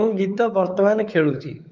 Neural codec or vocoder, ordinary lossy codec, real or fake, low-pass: codec, 16 kHz, 4 kbps, X-Codec, WavLM features, trained on Multilingual LibriSpeech; Opus, 24 kbps; fake; 7.2 kHz